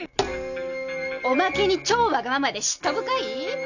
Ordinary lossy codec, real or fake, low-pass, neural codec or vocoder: none; real; 7.2 kHz; none